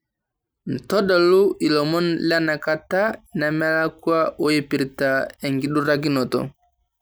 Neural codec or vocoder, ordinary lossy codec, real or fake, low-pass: none; none; real; none